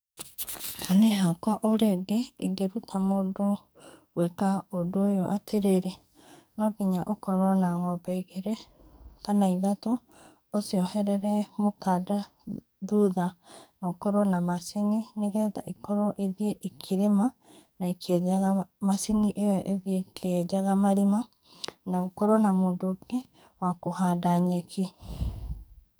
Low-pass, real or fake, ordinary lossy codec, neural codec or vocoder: none; fake; none; codec, 44.1 kHz, 2.6 kbps, SNAC